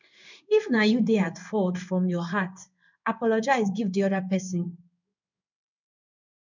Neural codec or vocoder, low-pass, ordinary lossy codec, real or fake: codec, 16 kHz in and 24 kHz out, 1 kbps, XY-Tokenizer; 7.2 kHz; none; fake